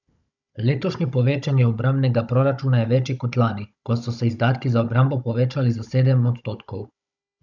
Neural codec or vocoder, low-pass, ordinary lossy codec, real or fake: codec, 16 kHz, 16 kbps, FunCodec, trained on Chinese and English, 50 frames a second; 7.2 kHz; none; fake